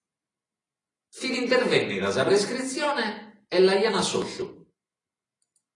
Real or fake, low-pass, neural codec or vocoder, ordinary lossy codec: real; 10.8 kHz; none; AAC, 32 kbps